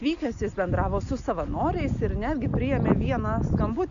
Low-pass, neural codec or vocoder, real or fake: 7.2 kHz; none; real